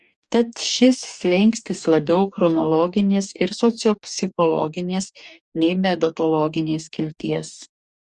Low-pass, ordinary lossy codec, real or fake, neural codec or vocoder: 10.8 kHz; Opus, 64 kbps; fake; codec, 44.1 kHz, 2.6 kbps, DAC